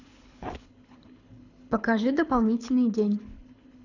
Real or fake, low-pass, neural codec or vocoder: fake; 7.2 kHz; codec, 24 kHz, 6 kbps, HILCodec